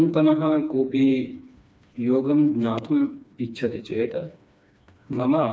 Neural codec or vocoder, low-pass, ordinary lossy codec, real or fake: codec, 16 kHz, 2 kbps, FreqCodec, smaller model; none; none; fake